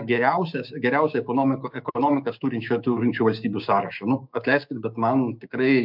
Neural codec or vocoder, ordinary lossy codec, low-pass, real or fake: codec, 16 kHz, 6 kbps, DAC; MP3, 48 kbps; 5.4 kHz; fake